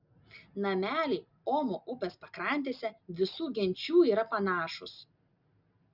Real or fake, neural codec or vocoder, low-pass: real; none; 5.4 kHz